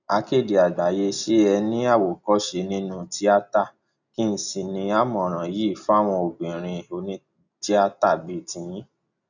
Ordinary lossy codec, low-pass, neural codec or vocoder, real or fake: none; 7.2 kHz; none; real